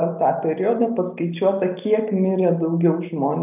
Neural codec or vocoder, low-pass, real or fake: none; 3.6 kHz; real